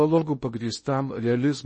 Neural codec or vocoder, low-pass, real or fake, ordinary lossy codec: codec, 16 kHz in and 24 kHz out, 0.8 kbps, FocalCodec, streaming, 65536 codes; 10.8 kHz; fake; MP3, 32 kbps